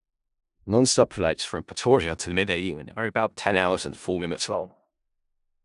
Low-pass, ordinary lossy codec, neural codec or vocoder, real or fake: 10.8 kHz; none; codec, 16 kHz in and 24 kHz out, 0.4 kbps, LongCat-Audio-Codec, four codebook decoder; fake